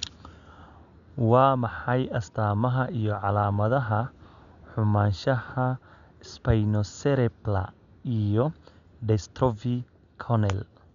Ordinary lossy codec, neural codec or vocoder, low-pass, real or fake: none; none; 7.2 kHz; real